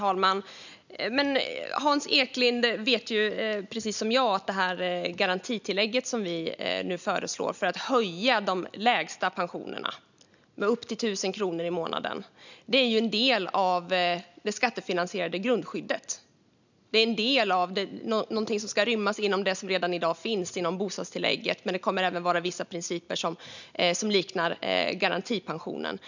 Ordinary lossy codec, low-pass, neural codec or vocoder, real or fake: none; 7.2 kHz; none; real